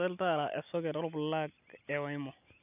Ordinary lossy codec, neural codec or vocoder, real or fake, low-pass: none; none; real; 3.6 kHz